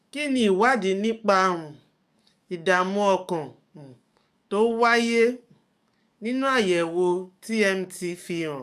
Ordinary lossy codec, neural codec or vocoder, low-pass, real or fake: none; codec, 44.1 kHz, 7.8 kbps, DAC; 14.4 kHz; fake